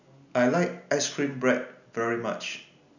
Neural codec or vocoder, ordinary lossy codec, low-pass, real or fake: none; none; 7.2 kHz; real